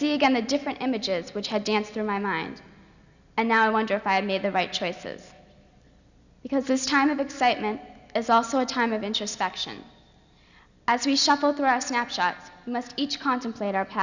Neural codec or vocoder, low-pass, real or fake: none; 7.2 kHz; real